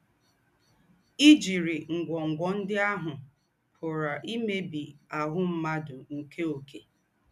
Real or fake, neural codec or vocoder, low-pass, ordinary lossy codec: real; none; 14.4 kHz; none